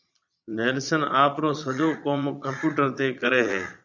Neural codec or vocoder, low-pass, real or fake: vocoder, 22.05 kHz, 80 mel bands, Vocos; 7.2 kHz; fake